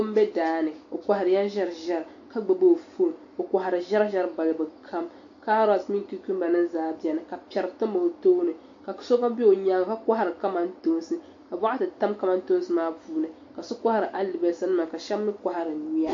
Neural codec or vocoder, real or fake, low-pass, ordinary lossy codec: none; real; 7.2 kHz; AAC, 48 kbps